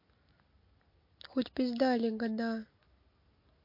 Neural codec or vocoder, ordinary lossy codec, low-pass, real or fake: none; MP3, 32 kbps; 5.4 kHz; real